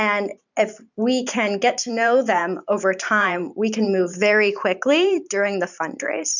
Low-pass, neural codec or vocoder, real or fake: 7.2 kHz; vocoder, 44.1 kHz, 128 mel bands every 512 samples, BigVGAN v2; fake